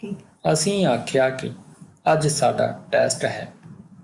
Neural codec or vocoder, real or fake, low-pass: codec, 44.1 kHz, 7.8 kbps, DAC; fake; 10.8 kHz